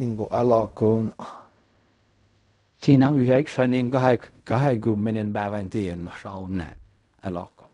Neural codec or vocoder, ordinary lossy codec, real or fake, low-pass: codec, 16 kHz in and 24 kHz out, 0.4 kbps, LongCat-Audio-Codec, fine tuned four codebook decoder; none; fake; 10.8 kHz